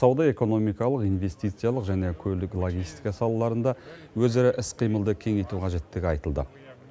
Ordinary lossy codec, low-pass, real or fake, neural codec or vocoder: none; none; real; none